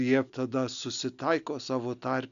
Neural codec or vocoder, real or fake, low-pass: none; real; 7.2 kHz